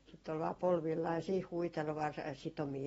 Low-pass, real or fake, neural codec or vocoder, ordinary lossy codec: 19.8 kHz; real; none; AAC, 24 kbps